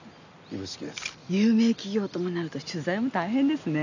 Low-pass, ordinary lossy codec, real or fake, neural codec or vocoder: 7.2 kHz; none; real; none